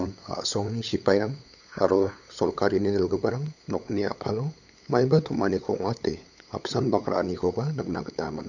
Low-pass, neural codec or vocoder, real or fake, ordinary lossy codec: 7.2 kHz; codec, 16 kHz, 8 kbps, FunCodec, trained on LibriTTS, 25 frames a second; fake; none